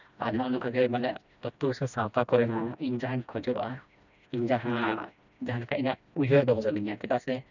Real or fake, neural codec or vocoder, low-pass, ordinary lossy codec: fake; codec, 16 kHz, 1 kbps, FreqCodec, smaller model; 7.2 kHz; none